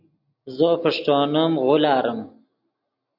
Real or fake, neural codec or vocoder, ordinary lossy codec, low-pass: real; none; AAC, 48 kbps; 5.4 kHz